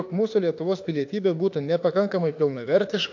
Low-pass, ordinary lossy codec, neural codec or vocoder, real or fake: 7.2 kHz; AAC, 48 kbps; autoencoder, 48 kHz, 32 numbers a frame, DAC-VAE, trained on Japanese speech; fake